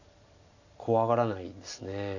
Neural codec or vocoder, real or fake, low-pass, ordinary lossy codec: none; real; 7.2 kHz; none